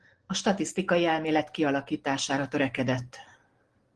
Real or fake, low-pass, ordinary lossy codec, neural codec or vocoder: real; 10.8 kHz; Opus, 16 kbps; none